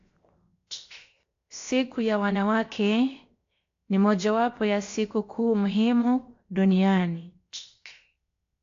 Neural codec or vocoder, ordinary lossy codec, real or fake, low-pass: codec, 16 kHz, 0.7 kbps, FocalCodec; AAC, 48 kbps; fake; 7.2 kHz